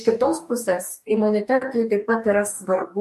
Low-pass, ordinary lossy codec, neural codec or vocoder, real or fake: 14.4 kHz; MP3, 64 kbps; codec, 44.1 kHz, 2.6 kbps, DAC; fake